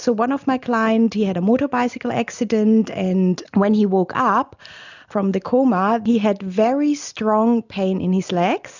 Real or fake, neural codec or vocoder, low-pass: real; none; 7.2 kHz